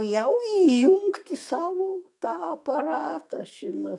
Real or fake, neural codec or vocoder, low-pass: fake; codec, 32 kHz, 1.9 kbps, SNAC; 10.8 kHz